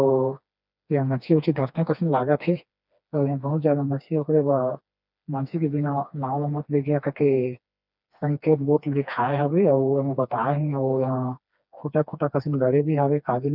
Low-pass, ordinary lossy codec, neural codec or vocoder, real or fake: 5.4 kHz; none; codec, 16 kHz, 2 kbps, FreqCodec, smaller model; fake